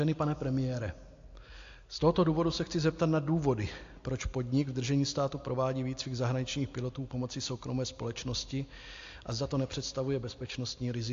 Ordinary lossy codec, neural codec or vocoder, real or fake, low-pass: AAC, 48 kbps; none; real; 7.2 kHz